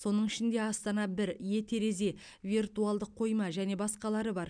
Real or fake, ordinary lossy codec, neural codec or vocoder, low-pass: real; none; none; 9.9 kHz